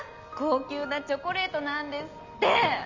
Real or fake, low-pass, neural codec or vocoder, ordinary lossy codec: real; 7.2 kHz; none; MP3, 64 kbps